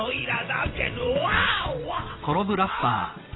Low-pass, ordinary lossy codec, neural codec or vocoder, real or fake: 7.2 kHz; AAC, 16 kbps; vocoder, 22.05 kHz, 80 mel bands, WaveNeXt; fake